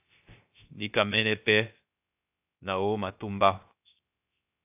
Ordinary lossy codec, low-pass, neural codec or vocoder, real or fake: AAC, 32 kbps; 3.6 kHz; codec, 16 kHz, 0.3 kbps, FocalCodec; fake